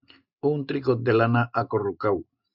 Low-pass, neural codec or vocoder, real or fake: 5.4 kHz; none; real